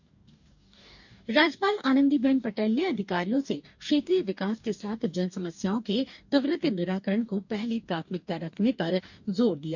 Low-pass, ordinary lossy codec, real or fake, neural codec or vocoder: 7.2 kHz; none; fake; codec, 44.1 kHz, 2.6 kbps, DAC